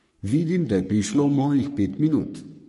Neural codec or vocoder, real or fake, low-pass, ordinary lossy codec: codec, 44.1 kHz, 3.4 kbps, Pupu-Codec; fake; 14.4 kHz; MP3, 48 kbps